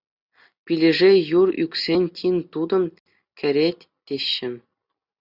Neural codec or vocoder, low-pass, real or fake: none; 5.4 kHz; real